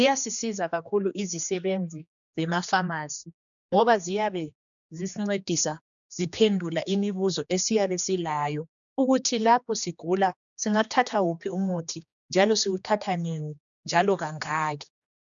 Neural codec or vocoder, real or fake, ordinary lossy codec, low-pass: codec, 16 kHz, 2 kbps, X-Codec, HuBERT features, trained on general audio; fake; MP3, 96 kbps; 7.2 kHz